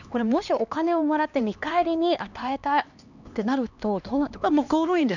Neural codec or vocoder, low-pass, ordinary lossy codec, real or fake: codec, 16 kHz, 2 kbps, X-Codec, HuBERT features, trained on LibriSpeech; 7.2 kHz; none; fake